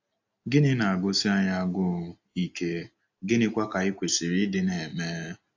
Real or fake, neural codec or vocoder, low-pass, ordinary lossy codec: real; none; 7.2 kHz; AAC, 48 kbps